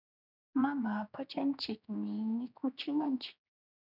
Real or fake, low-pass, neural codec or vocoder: fake; 5.4 kHz; codec, 16 kHz, 2 kbps, X-Codec, HuBERT features, trained on general audio